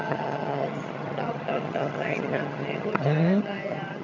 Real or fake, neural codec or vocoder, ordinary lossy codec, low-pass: fake; vocoder, 22.05 kHz, 80 mel bands, HiFi-GAN; none; 7.2 kHz